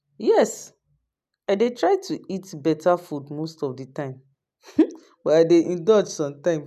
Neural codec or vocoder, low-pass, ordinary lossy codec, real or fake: none; 14.4 kHz; none; real